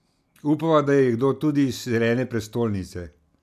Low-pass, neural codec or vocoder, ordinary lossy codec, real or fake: 14.4 kHz; none; none; real